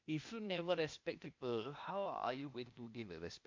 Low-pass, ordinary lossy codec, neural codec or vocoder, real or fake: 7.2 kHz; MP3, 48 kbps; codec, 16 kHz, 0.8 kbps, ZipCodec; fake